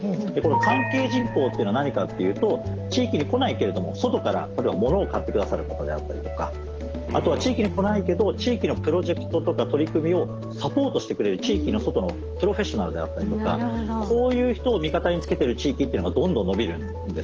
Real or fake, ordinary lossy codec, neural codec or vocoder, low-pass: real; Opus, 16 kbps; none; 7.2 kHz